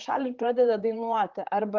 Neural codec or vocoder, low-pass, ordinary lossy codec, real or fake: vocoder, 44.1 kHz, 128 mel bands, Pupu-Vocoder; 7.2 kHz; Opus, 32 kbps; fake